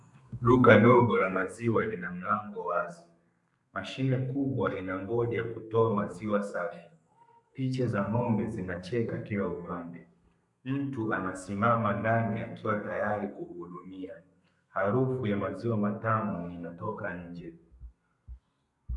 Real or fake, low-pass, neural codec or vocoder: fake; 10.8 kHz; codec, 32 kHz, 1.9 kbps, SNAC